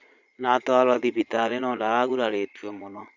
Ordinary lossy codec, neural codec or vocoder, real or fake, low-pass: none; vocoder, 22.05 kHz, 80 mel bands, Vocos; fake; 7.2 kHz